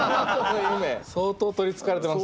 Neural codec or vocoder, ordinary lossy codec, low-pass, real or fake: none; none; none; real